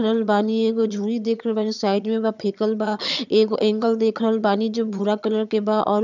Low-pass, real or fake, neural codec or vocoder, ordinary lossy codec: 7.2 kHz; fake; vocoder, 22.05 kHz, 80 mel bands, HiFi-GAN; none